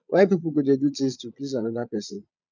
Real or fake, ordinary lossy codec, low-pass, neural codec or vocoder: fake; none; 7.2 kHz; vocoder, 22.05 kHz, 80 mel bands, Vocos